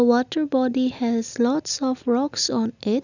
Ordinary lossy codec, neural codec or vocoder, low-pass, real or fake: none; none; 7.2 kHz; real